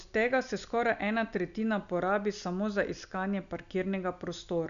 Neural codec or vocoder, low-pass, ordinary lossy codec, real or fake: none; 7.2 kHz; none; real